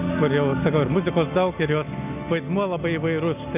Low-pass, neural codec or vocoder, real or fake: 3.6 kHz; none; real